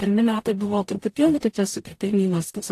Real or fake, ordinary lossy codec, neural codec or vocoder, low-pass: fake; AAC, 64 kbps; codec, 44.1 kHz, 0.9 kbps, DAC; 14.4 kHz